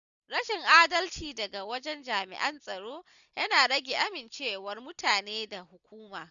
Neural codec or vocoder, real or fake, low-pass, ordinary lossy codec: none; real; 7.2 kHz; none